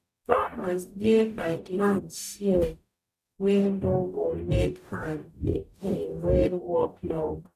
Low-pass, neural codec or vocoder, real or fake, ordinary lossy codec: 14.4 kHz; codec, 44.1 kHz, 0.9 kbps, DAC; fake; none